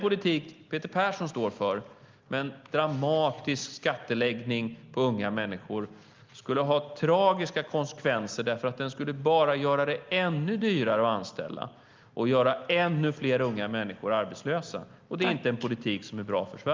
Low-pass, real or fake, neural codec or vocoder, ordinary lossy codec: 7.2 kHz; real; none; Opus, 32 kbps